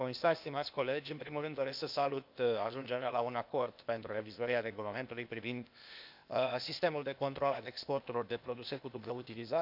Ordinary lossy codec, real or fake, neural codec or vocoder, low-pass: none; fake; codec, 16 kHz, 0.8 kbps, ZipCodec; 5.4 kHz